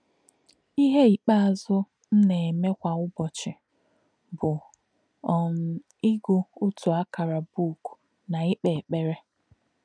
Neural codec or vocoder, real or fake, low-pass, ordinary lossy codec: none; real; 9.9 kHz; none